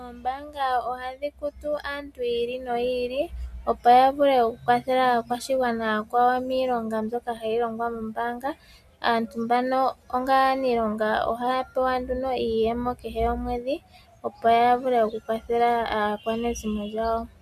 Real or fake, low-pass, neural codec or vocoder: real; 14.4 kHz; none